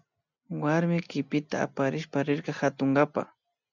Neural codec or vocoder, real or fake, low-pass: none; real; 7.2 kHz